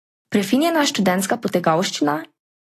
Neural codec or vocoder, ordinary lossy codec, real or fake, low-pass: none; AAC, 48 kbps; real; 14.4 kHz